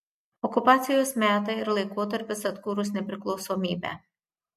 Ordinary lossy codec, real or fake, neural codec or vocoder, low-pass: MP3, 64 kbps; real; none; 14.4 kHz